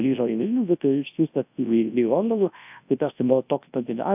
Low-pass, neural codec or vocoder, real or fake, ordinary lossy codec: 3.6 kHz; codec, 24 kHz, 0.9 kbps, WavTokenizer, large speech release; fake; AAC, 32 kbps